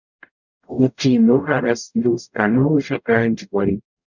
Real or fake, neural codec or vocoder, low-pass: fake; codec, 44.1 kHz, 0.9 kbps, DAC; 7.2 kHz